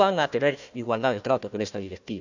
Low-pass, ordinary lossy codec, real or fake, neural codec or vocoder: 7.2 kHz; none; fake; codec, 16 kHz, 1 kbps, FunCodec, trained on Chinese and English, 50 frames a second